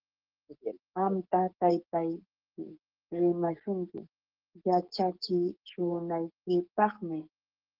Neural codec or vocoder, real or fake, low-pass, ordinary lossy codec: codec, 44.1 kHz, 7.8 kbps, DAC; fake; 5.4 kHz; Opus, 16 kbps